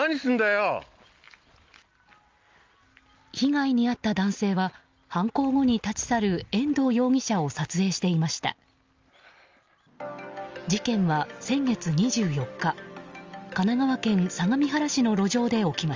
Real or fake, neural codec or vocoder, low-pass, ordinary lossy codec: real; none; 7.2 kHz; Opus, 32 kbps